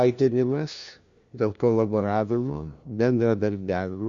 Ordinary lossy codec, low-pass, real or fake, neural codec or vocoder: Opus, 64 kbps; 7.2 kHz; fake; codec, 16 kHz, 1 kbps, FunCodec, trained on LibriTTS, 50 frames a second